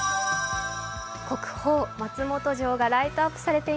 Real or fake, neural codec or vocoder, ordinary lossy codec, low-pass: real; none; none; none